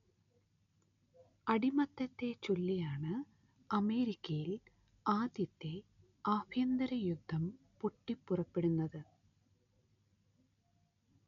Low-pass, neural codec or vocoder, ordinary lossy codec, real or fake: 7.2 kHz; none; none; real